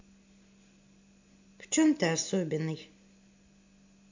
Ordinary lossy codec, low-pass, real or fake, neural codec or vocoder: AAC, 48 kbps; 7.2 kHz; real; none